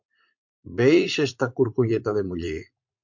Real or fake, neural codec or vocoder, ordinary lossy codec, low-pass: fake; vocoder, 44.1 kHz, 80 mel bands, Vocos; MP3, 48 kbps; 7.2 kHz